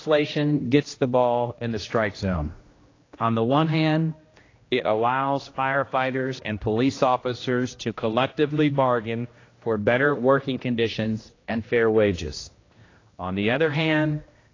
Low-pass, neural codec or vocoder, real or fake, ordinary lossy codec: 7.2 kHz; codec, 16 kHz, 1 kbps, X-Codec, HuBERT features, trained on general audio; fake; AAC, 32 kbps